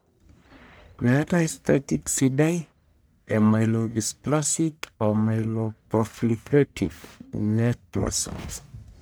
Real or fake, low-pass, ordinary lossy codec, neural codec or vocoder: fake; none; none; codec, 44.1 kHz, 1.7 kbps, Pupu-Codec